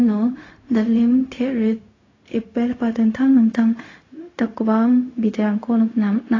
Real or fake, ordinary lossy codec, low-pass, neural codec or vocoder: fake; AAC, 32 kbps; 7.2 kHz; codec, 16 kHz, 0.4 kbps, LongCat-Audio-Codec